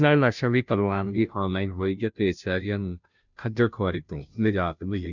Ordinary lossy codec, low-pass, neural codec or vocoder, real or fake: none; 7.2 kHz; codec, 16 kHz, 0.5 kbps, FunCodec, trained on Chinese and English, 25 frames a second; fake